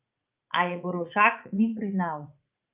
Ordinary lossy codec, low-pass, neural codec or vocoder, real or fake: Opus, 24 kbps; 3.6 kHz; vocoder, 44.1 kHz, 80 mel bands, Vocos; fake